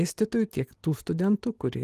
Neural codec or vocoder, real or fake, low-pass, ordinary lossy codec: none; real; 14.4 kHz; Opus, 24 kbps